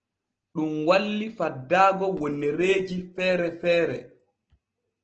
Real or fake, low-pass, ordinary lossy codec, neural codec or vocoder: real; 7.2 kHz; Opus, 16 kbps; none